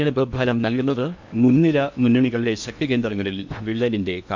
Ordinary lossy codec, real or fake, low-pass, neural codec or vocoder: MP3, 64 kbps; fake; 7.2 kHz; codec, 16 kHz in and 24 kHz out, 0.8 kbps, FocalCodec, streaming, 65536 codes